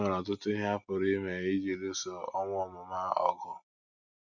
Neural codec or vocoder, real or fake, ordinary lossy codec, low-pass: none; real; Opus, 64 kbps; 7.2 kHz